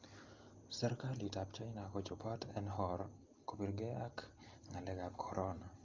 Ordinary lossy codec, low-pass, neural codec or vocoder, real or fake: Opus, 32 kbps; 7.2 kHz; none; real